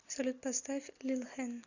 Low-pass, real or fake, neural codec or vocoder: 7.2 kHz; real; none